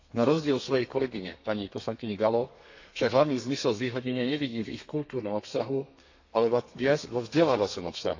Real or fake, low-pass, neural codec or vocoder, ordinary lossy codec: fake; 7.2 kHz; codec, 32 kHz, 1.9 kbps, SNAC; AAC, 48 kbps